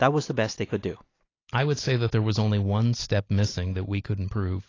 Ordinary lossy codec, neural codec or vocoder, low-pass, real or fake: AAC, 32 kbps; none; 7.2 kHz; real